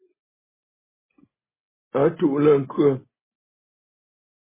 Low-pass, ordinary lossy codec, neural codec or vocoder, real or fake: 3.6 kHz; MP3, 16 kbps; none; real